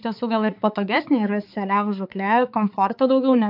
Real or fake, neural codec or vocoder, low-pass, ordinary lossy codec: fake; codec, 16 kHz, 4 kbps, X-Codec, HuBERT features, trained on balanced general audio; 5.4 kHz; AAC, 48 kbps